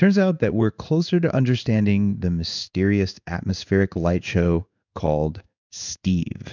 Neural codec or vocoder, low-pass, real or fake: codec, 16 kHz in and 24 kHz out, 1 kbps, XY-Tokenizer; 7.2 kHz; fake